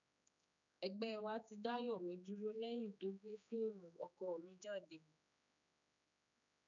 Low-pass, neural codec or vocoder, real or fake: 7.2 kHz; codec, 16 kHz, 2 kbps, X-Codec, HuBERT features, trained on general audio; fake